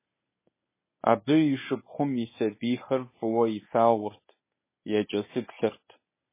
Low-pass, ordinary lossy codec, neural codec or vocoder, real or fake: 3.6 kHz; MP3, 16 kbps; codec, 24 kHz, 0.9 kbps, WavTokenizer, medium speech release version 1; fake